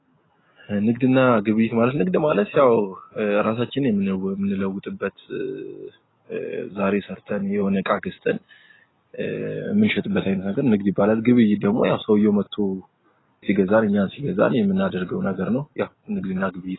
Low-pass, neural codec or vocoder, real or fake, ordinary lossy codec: 7.2 kHz; none; real; AAC, 16 kbps